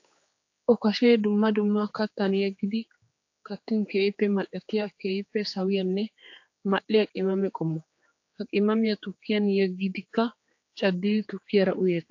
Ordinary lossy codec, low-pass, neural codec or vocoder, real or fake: AAC, 48 kbps; 7.2 kHz; codec, 16 kHz, 4 kbps, X-Codec, HuBERT features, trained on general audio; fake